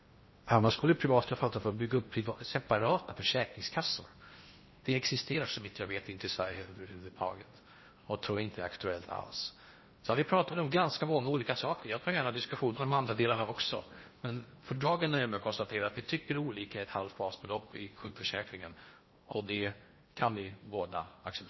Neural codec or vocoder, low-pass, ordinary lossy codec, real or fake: codec, 16 kHz in and 24 kHz out, 0.8 kbps, FocalCodec, streaming, 65536 codes; 7.2 kHz; MP3, 24 kbps; fake